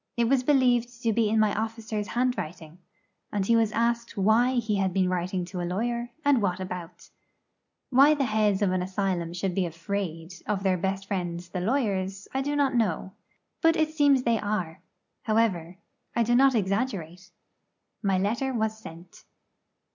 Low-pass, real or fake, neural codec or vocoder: 7.2 kHz; real; none